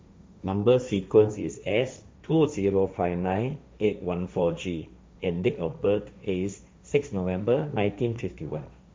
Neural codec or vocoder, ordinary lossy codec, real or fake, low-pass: codec, 16 kHz, 1.1 kbps, Voila-Tokenizer; none; fake; none